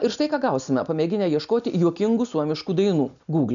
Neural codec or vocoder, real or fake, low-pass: none; real; 7.2 kHz